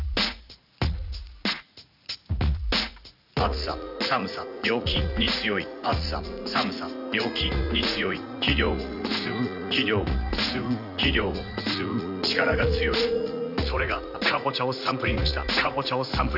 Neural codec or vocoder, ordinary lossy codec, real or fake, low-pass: vocoder, 44.1 kHz, 80 mel bands, Vocos; none; fake; 5.4 kHz